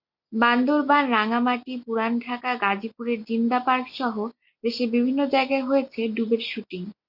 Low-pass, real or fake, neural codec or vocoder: 5.4 kHz; real; none